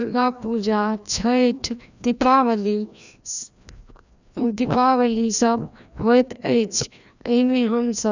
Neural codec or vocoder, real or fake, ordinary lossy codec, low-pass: codec, 16 kHz, 1 kbps, FreqCodec, larger model; fake; none; 7.2 kHz